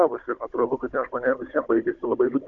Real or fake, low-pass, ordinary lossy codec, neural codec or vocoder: fake; 7.2 kHz; Opus, 64 kbps; codec, 16 kHz, 16 kbps, FunCodec, trained on Chinese and English, 50 frames a second